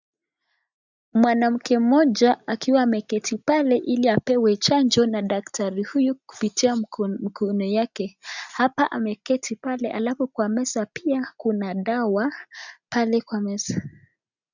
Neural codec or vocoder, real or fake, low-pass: none; real; 7.2 kHz